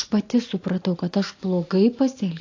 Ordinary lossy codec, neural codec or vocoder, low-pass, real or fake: AAC, 48 kbps; none; 7.2 kHz; real